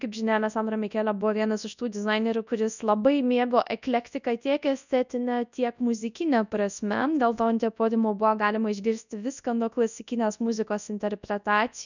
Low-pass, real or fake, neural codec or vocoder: 7.2 kHz; fake; codec, 24 kHz, 0.9 kbps, WavTokenizer, large speech release